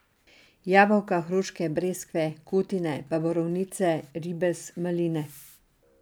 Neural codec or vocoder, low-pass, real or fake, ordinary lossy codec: none; none; real; none